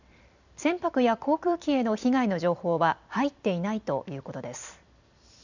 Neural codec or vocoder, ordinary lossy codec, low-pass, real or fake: vocoder, 44.1 kHz, 128 mel bands every 256 samples, BigVGAN v2; none; 7.2 kHz; fake